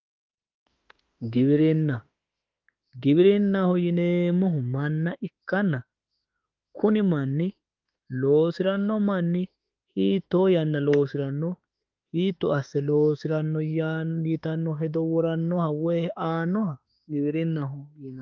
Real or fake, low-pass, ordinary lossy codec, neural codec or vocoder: fake; 7.2 kHz; Opus, 24 kbps; autoencoder, 48 kHz, 32 numbers a frame, DAC-VAE, trained on Japanese speech